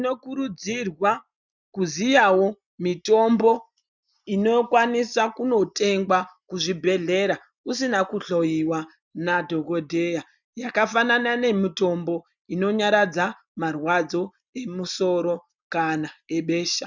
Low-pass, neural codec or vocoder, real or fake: 7.2 kHz; none; real